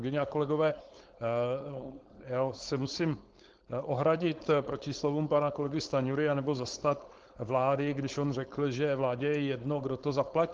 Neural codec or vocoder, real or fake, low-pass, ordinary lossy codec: codec, 16 kHz, 4.8 kbps, FACodec; fake; 7.2 kHz; Opus, 16 kbps